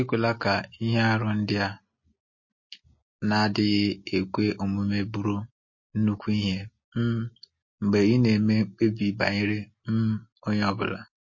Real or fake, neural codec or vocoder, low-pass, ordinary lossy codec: real; none; 7.2 kHz; MP3, 32 kbps